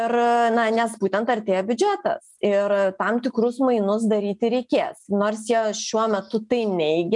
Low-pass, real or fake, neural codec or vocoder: 10.8 kHz; real; none